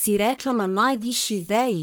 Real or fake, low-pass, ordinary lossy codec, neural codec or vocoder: fake; none; none; codec, 44.1 kHz, 1.7 kbps, Pupu-Codec